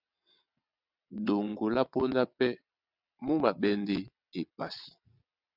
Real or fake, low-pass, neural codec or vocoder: fake; 5.4 kHz; vocoder, 22.05 kHz, 80 mel bands, WaveNeXt